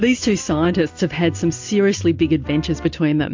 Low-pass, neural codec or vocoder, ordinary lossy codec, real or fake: 7.2 kHz; none; MP3, 48 kbps; real